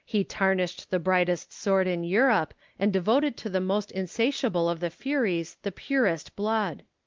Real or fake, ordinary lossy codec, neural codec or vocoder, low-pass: real; Opus, 32 kbps; none; 7.2 kHz